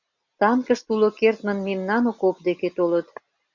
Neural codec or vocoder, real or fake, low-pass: none; real; 7.2 kHz